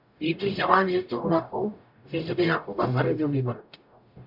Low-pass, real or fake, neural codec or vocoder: 5.4 kHz; fake; codec, 44.1 kHz, 0.9 kbps, DAC